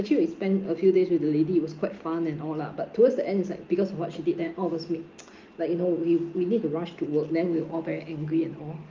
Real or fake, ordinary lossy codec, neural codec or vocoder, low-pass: real; Opus, 32 kbps; none; 7.2 kHz